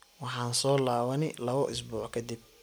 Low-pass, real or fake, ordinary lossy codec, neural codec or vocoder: none; real; none; none